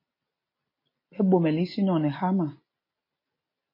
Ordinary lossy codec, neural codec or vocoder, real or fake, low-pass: MP3, 24 kbps; none; real; 5.4 kHz